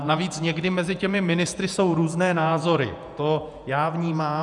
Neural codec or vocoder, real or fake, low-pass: vocoder, 48 kHz, 128 mel bands, Vocos; fake; 10.8 kHz